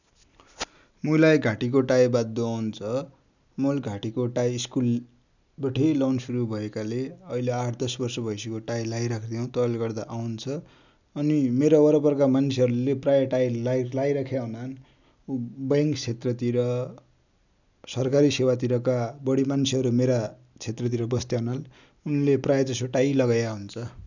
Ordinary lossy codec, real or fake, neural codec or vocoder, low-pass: none; real; none; 7.2 kHz